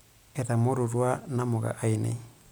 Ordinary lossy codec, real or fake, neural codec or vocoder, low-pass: none; real; none; none